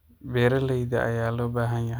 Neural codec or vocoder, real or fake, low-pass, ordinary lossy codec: none; real; none; none